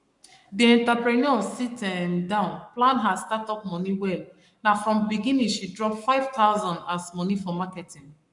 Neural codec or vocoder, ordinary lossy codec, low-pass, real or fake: codec, 44.1 kHz, 7.8 kbps, Pupu-Codec; none; 10.8 kHz; fake